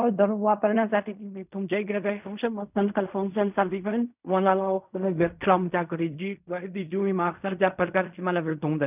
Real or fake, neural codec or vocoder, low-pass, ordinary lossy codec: fake; codec, 16 kHz in and 24 kHz out, 0.4 kbps, LongCat-Audio-Codec, fine tuned four codebook decoder; 3.6 kHz; none